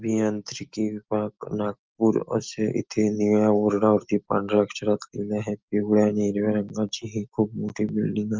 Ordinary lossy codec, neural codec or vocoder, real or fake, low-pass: Opus, 32 kbps; none; real; 7.2 kHz